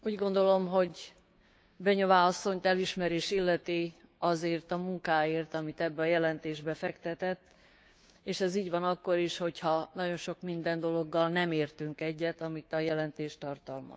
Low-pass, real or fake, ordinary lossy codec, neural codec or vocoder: none; fake; none; codec, 16 kHz, 6 kbps, DAC